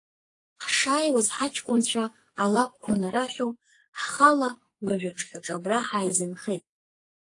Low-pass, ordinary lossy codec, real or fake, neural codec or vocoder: 10.8 kHz; AAC, 48 kbps; fake; codec, 44.1 kHz, 2.6 kbps, SNAC